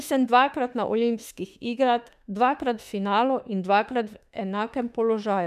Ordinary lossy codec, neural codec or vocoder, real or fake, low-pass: none; autoencoder, 48 kHz, 32 numbers a frame, DAC-VAE, trained on Japanese speech; fake; 14.4 kHz